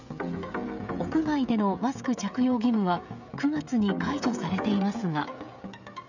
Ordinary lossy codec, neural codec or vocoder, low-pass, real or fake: none; codec, 16 kHz, 16 kbps, FreqCodec, smaller model; 7.2 kHz; fake